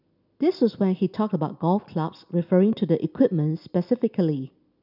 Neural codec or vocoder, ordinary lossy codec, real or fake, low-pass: none; none; real; 5.4 kHz